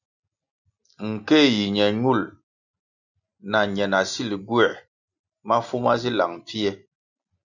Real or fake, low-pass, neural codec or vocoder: real; 7.2 kHz; none